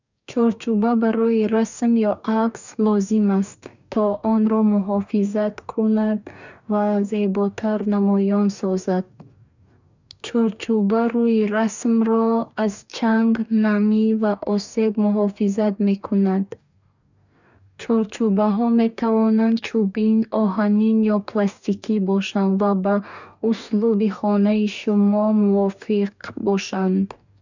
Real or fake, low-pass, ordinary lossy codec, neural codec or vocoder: fake; 7.2 kHz; none; codec, 44.1 kHz, 2.6 kbps, DAC